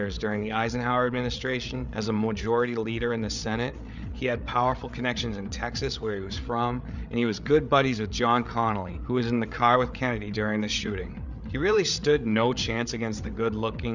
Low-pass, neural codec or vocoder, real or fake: 7.2 kHz; codec, 16 kHz, 8 kbps, FreqCodec, larger model; fake